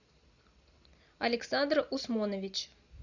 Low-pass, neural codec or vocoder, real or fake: 7.2 kHz; none; real